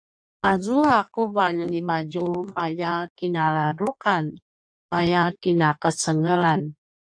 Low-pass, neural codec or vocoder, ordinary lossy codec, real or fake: 9.9 kHz; codec, 16 kHz in and 24 kHz out, 1.1 kbps, FireRedTTS-2 codec; AAC, 64 kbps; fake